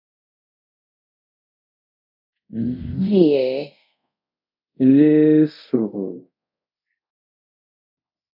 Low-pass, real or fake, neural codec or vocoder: 5.4 kHz; fake; codec, 24 kHz, 0.5 kbps, DualCodec